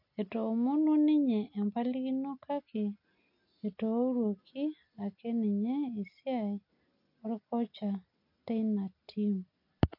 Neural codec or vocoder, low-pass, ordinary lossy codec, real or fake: none; 5.4 kHz; MP3, 32 kbps; real